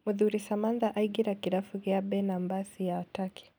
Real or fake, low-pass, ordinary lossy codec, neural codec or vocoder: real; none; none; none